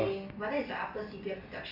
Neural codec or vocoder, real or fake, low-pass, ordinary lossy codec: none; real; 5.4 kHz; none